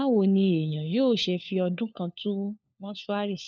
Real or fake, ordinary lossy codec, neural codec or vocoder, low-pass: fake; none; codec, 16 kHz, 4 kbps, FunCodec, trained on LibriTTS, 50 frames a second; none